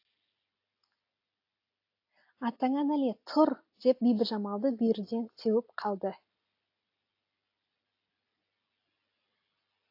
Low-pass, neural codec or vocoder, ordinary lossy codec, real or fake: 5.4 kHz; none; AAC, 32 kbps; real